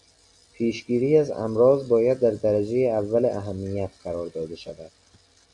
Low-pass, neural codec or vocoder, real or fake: 10.8 kHz; none; real